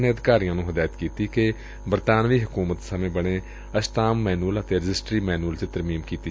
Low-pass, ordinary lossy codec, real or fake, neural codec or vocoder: none; none; real; none